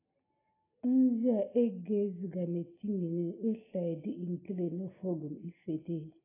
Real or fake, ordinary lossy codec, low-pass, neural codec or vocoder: real; AAC, 16 kbps; 3.6 kHz; none